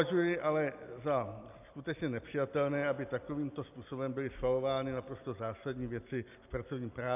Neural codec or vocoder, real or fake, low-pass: none; real; 3.6 kHz